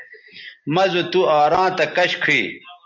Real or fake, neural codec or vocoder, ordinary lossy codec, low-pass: real; none; MP3, 48 kbps; 7.2 kHz